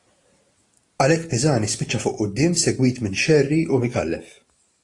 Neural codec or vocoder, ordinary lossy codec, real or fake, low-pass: none; AAC, 48 kbps; real; 10.8 kHz